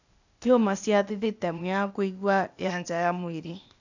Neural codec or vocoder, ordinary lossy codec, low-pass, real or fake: codec, 16 kHz, 0.8 kbps, ZipCodec; none; 7.2 kHz; fake